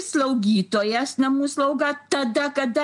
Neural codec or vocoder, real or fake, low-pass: none; real; 10.8 kHz